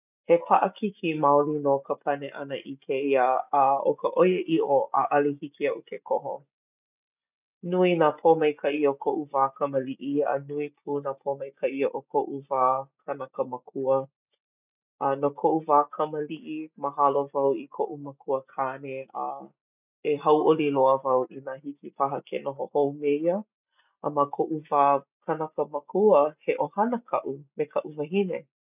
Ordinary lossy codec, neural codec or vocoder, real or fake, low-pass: none; none; real; 3.6 kHz